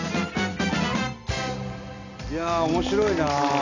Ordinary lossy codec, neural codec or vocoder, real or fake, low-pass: none; none; real; 7.2 kHz